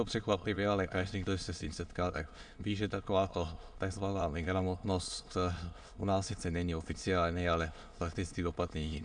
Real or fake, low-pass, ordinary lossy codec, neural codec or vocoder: fake; 9.9 kHz; AAC, 64 kbps; autoencoder, 22.05 kHz, a latent of 192 numbers a frame, VITS, trained on many speakers